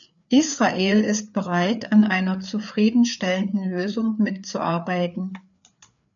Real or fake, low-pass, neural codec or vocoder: fake; 7.2 kHz; codec, 16 kHz, 4 kbps, FreqCodec, larger model